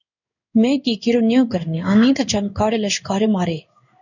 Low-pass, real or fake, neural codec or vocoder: 7.2 kHz; fake; codec, 16 kHz in and 24 kHz out, 1 kbps, XY-Tokenizer